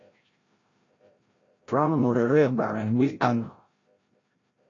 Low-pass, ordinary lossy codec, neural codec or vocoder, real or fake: 7.2 kHz; AAC, 32 kbps; codec, 16 kHz, 0.5 kbps, FreqCodec, larger model; fake